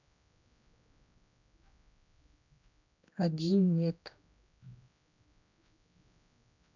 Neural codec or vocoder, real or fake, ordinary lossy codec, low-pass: codec, 16 kHz, 1 kbps, X-Codec, HuBERT features, trained on general audio; fake; none; 7.2 kHz